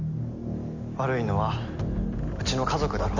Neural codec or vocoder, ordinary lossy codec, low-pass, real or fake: none; none; 7.2 kHz; real